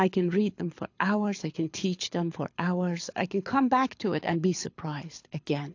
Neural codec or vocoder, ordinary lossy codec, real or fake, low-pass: codec, 24 kHz, 6 kbps, HILCodec; AAC, 48 kbps; fake; 7.2 kHz